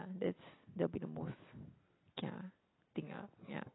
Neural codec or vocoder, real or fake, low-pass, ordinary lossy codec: none; real; 7.2 kHz; AAC, 16 kbps